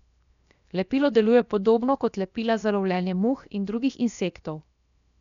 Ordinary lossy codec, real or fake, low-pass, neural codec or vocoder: MP3, 96 kbps; fake; 7.2 kHz; codec, 16 kHz, 0.7 kbps, FocalCodec